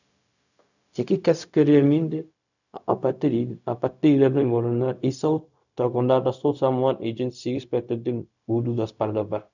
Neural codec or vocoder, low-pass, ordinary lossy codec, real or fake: codec, 16 kHz, 0.4 kbps, LongCat-Audio-Codec; 7.2 kHz; none; fake